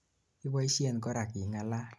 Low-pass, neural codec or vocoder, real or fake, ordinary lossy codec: none; none; real; none